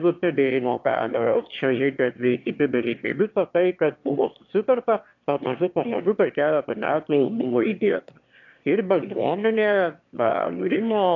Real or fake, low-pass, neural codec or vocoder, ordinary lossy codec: fake; 7.2 kHz; autoencoder, 22.05 kHz, a latent of 192 numbers a frame, VITS, trained on one speaker; AAC, 48 kbps